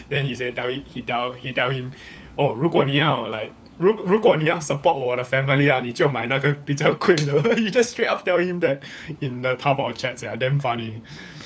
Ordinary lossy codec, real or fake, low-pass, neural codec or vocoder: none; fake; none; codec, 16 kHz, 4 kbps, FunCodec, trained on LibriTTS, 50 frames a second